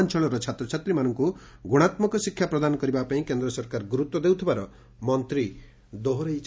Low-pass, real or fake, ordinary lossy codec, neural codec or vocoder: none; real; none; none